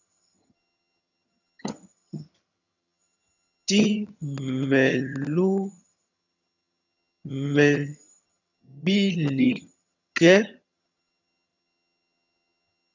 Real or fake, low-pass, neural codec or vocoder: fake; 7.2 kHz; vocoder, 22.05 kHz, 80 mel bands, HiFi-GAN